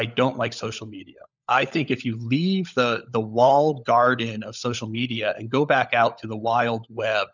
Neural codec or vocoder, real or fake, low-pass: codec, 16 kHz, 16 kbps, FunCodec, trained on LibriTTS, 50 frames a second; fake; 7.2 kHz